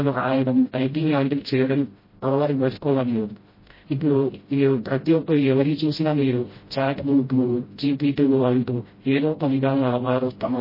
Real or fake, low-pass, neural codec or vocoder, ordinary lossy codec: fake; 5.4 kHz; codec, 16 kHz, 0.5 kbps, FreqCodec, smaller model; MP3, 24 kbps